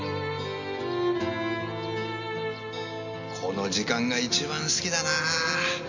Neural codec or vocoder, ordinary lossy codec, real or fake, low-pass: none; none; real; 7.2 kHz